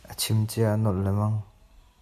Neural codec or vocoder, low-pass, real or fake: none; 14.4 kHz; real